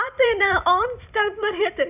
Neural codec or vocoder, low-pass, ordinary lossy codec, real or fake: vocoder, 44.1 kHz, 128 mel bands every 512 samples, BigVGAN v2; 3.6 kHz; none; fake